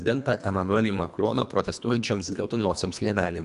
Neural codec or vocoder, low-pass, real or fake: codec, 24 kHz, 1.5 kbps, HILCodec; 10.8 kHz; fake